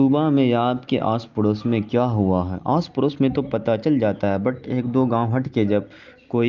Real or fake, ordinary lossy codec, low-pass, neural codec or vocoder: real; Opus, 32 kbps; 7.2 kHz; none